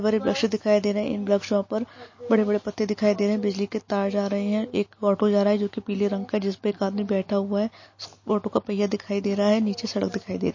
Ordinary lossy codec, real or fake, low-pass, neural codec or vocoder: MP3, 32 kbps; real; 7.2 kHz; none